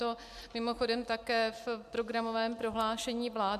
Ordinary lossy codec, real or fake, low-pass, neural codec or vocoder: MP3, 96 kbps; real; 14.4 kHz; none